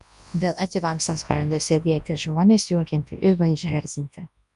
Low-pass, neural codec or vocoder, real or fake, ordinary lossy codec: 10.8 kHz; codec, 24 kHz, 0.9 kbps, WavTokenizer, large speech release; fake; AAC, 96 kbps